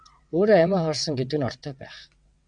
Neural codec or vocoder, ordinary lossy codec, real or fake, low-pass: vocoder, 22.05 kHz, 80 mel bands, WaveNeXt; MP3, 96 kbps; fake; 9.9 kHz